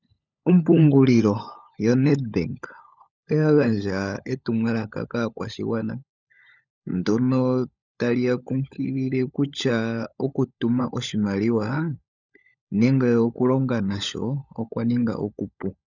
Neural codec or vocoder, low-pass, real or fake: codec, 16 kHz, 16 kbps, FunCodec, trained on LibriTTS, 50 frames a second; 7.2 kHz; fake